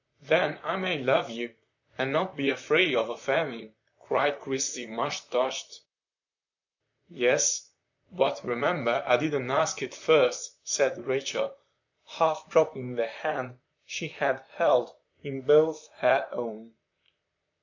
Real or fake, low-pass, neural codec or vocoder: fake; 7.2 kHz; vocoder, 44.1 kHz, 128 mel bands, Pupu-Vocoder